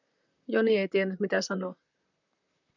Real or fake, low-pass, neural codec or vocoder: fake; 7.2 kHz; vocoder, 44.1 kHz, 128 mel bands, Pupu-Vocoder